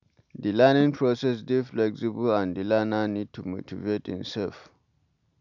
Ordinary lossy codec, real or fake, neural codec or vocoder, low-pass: none; real; none; 7.2 kHz